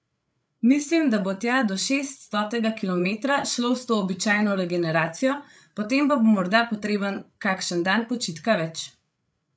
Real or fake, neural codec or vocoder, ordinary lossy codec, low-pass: fake; codec, 16 kHz, 8 kbps, FreqCodec, larger model; none; none